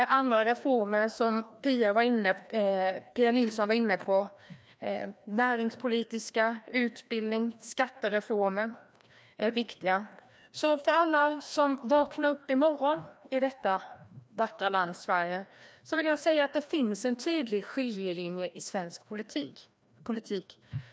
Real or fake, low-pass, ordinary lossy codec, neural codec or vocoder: fake; none; none; codec, 16 kHz, 1 kbps, FreqCodec, larger model